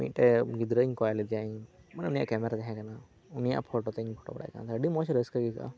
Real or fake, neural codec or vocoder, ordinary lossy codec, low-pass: real; none; none; none